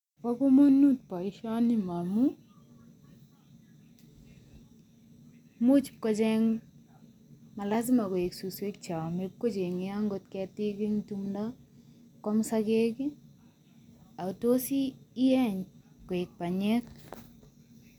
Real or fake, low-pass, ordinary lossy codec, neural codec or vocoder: real; 19.8 kHz; none; none